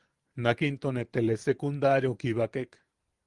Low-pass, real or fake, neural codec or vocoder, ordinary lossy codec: 10.8 kHz; fake; vocoder, 44.1 kHz, 128 mel bands every 512 samples, BigVGAN v2; Opus, 24 kbps